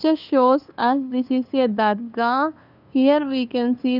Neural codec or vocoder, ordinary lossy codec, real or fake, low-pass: codec, 16 kHz, 2 kbps, FunCodec, trained on LibriTTS, 25 frames a second; none; fake; 5.4 kHz